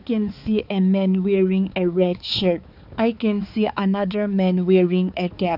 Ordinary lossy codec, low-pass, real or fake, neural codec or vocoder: none; 5.4 kHz; fake; codec, 16 kHz, 4 kbps, X-Codec, HuBERT features, trained on balanced general audio